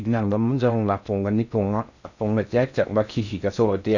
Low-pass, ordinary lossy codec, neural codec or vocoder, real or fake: 7.2 kHz; none; codec, 16 kHz in and 24 kHz out, 0.6 kbps, FocalCodec, streaming, 4096 codes; fake